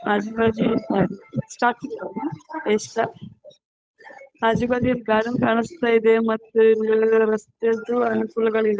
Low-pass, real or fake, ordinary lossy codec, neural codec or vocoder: none; fake; none; codec, 16 kHz, 8 kbps, FunCodec, trained on Chinese and English, 25 frames a second